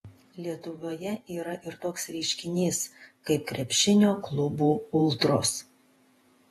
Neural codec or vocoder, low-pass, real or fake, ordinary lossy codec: vocoder, 48 kHz, 128 mel bands, Vocos; 19.8 kHz; fake; AAC, 32 kbps